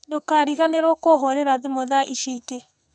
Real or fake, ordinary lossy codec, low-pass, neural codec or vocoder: fake; none; 9.9 kHz; codec, 44.1 kHz, 2.6 kbps, SNAC